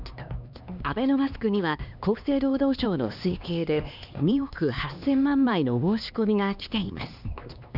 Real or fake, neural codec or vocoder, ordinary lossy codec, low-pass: fake; codec, 16 kHz, 2 kbps, X-Codec, HuBERT features, trained on LibriSpeech; none; 5.4 kHz